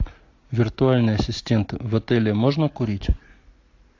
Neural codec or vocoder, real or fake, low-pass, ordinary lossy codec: none; real; 7.2 kHz; AAC, 48 kbps